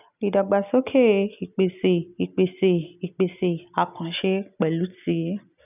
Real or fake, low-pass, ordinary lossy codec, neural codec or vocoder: real; 3.6 kHz; none; none